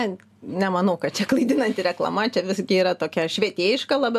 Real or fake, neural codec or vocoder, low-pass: real; none; 14.4 kHz